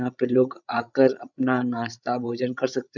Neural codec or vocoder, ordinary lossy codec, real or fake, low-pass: codec, 16 kHz, 16 kbps, FreqCodec, larger model; none; fake; 7.2 kHz